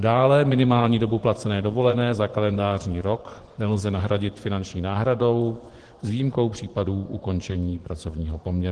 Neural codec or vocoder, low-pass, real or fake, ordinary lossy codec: vocoder, 24 kHz, 100 mel bands, Vocos; 10.8 kHz; fake; Opus, 16 kbps